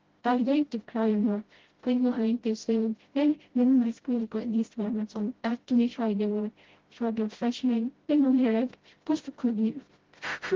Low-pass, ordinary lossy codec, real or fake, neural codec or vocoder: 7.2 kHz; Opus, 16 kbps; fake; codec, 16 kHz, 0.5 kbps, FreqCodec, smaller model